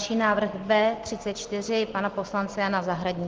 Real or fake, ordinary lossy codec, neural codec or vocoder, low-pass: real; Opus, 16 kbps; none; 7.2 kHz